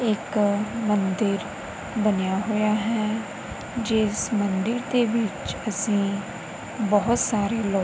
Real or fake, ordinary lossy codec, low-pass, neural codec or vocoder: real; none; none; none